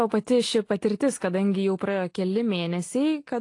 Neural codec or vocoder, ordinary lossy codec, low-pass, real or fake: none; AAC, 48 kbps; 10.8 kHz; real